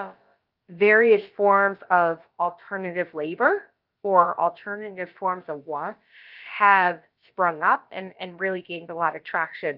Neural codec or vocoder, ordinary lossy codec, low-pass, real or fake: codec, 16 kHz, about 1 kbps, DyCAST, with the encoder's durations; Opus, 24 kbps; 5.4 kHz; fake